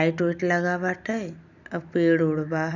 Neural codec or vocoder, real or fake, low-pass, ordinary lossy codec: none; real; 7.2 kHz; none